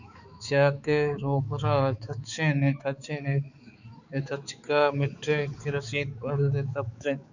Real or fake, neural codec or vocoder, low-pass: fake; codec, 16 kHz, 4 kbps, X-Codec, HuBERT features, trained on balanced general audio; 7.2 kHz